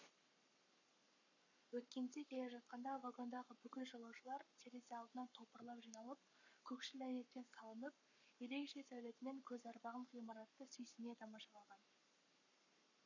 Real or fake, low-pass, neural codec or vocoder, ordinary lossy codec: fake; 7.2 kHz; codec, 44.1 kHz, 7.8 kbps, Pupu-Codec; none